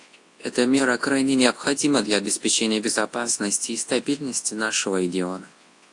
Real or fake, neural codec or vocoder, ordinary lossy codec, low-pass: fake; codec, 24 kHz, 0.9 kbps, WavTokenizer, large speech release; AAC, 48 kbps; 10.8 kHz